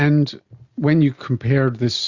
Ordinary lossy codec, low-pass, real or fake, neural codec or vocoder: Opus, 64 kbps; 7.2 kHz; real; none